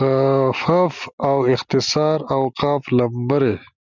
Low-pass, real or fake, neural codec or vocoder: 7.2 kHz; real; none